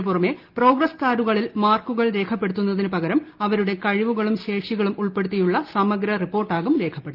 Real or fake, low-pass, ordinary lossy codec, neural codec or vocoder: real; 5.4 kHz; Opus, 32 kbps; none